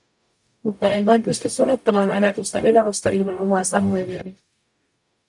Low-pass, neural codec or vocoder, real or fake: 10.8 kHz; codec, 44.1 kHz, 0.9 kbps, DAC; fake